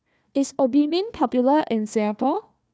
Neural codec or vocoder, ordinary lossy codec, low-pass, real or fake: codec, 16 kHz, 1 kbps, FunCodec, trained on Chinese and English, 50 frames a second; none; none; fake